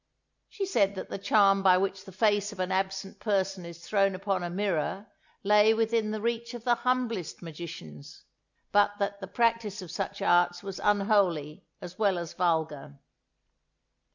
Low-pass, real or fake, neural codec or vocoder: 7.2 kHz; real; none